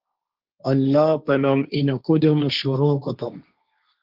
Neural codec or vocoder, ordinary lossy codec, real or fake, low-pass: codec, 16 kHz, 1.1 kbps, Voila-Tokenizer; Opus, 32 kbps; fake; 5.4 kHz